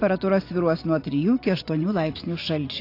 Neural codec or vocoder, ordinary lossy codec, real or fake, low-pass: vocoder, 24 kHz, 100 mel bands, Vocos; AAC, 32 kbps; fake; 5.4 kHz